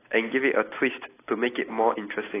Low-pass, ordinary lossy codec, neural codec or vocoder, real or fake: 3.6 kHz; AAC, 16 kbps; none; real